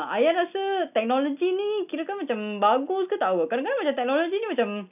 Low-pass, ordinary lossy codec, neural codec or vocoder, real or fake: 3.6 kHz; none; none; real